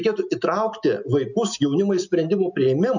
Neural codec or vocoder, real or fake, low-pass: none; real; 7.2 kHz